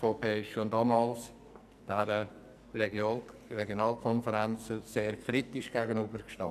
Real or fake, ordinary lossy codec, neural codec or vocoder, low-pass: fake; none; codec, 44.1 kHz, 2.6 kbps, SNAC; 14.4 kHz